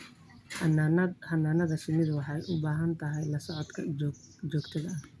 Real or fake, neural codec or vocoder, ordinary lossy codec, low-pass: real; none; none; none